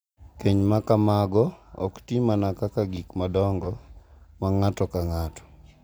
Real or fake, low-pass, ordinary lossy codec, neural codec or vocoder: real; none; none; none